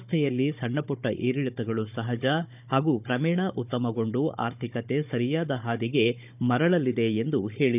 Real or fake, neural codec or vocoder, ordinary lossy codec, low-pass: fake; codec, 16 kHz, 16 kbps, FunCodec, trained on Chinese and English, 50 frames a second; none; 3.6 kHz